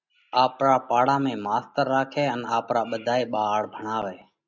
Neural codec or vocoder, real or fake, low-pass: none; real; 7.2 kHz